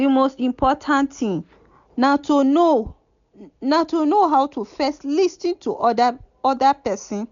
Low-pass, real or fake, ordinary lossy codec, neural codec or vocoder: 7.2 kHz; real; none; none